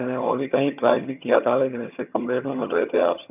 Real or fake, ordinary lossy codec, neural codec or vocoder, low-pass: fake; none; vocoder, 22.05 kHz, 80 mel bands, HiFi-GAN; 3.6 kHz